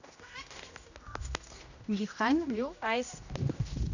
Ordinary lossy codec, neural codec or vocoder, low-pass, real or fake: none; codec, 16 kHz, 1 kbps, X-Codec, HuBERT features, trained on general audio; 7.2 kHz; fake